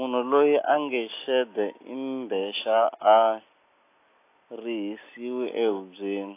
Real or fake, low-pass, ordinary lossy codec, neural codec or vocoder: real; 3.6 kHz; AAC, 24 kbps; none